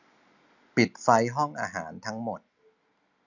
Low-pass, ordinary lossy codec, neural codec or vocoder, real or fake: 7.2 kHz; none; none; real